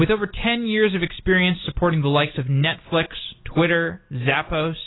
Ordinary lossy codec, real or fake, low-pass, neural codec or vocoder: AAC, 16 kbps; real; 7.2 kHz; none